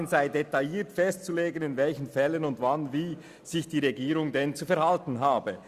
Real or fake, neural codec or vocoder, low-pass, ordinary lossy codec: real; none; 14.4 kHz; Opus, 64 kbps